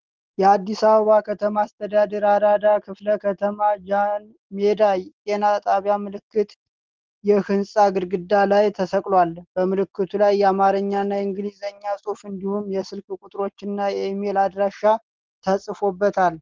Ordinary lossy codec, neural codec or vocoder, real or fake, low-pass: Opus, 16 kbps; none; real; 7.2 kHz